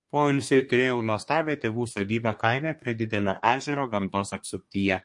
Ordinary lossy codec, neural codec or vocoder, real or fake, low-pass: MP3, 48 kbps; codec, 24 kHz, 1 kbps, SNAC; fake; 10.8 kHz